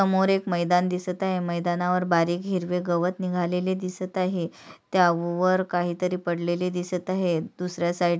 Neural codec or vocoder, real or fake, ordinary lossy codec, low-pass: none; real; none; none